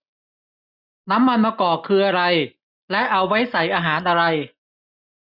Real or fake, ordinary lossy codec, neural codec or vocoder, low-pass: real; none; none; 5.4 kHz